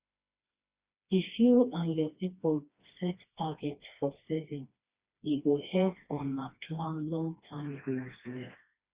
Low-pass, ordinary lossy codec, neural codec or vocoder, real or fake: 3.6 kHz; Opus, 64 kbps; codec, 16 kHz, 2 kbps, FreqCodec, smaller model; fake